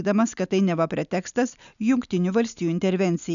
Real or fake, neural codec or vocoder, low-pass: real; none; 7.2 kHz